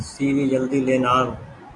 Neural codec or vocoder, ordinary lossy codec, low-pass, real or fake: none; AAC, 48 kbps; 10.8 kHz; real